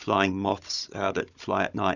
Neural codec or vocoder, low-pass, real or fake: codec, 16 kHz, 16 kbps, FunCodec, trained on Chinese and English, 50 frames a second; 7.2 kHz; fake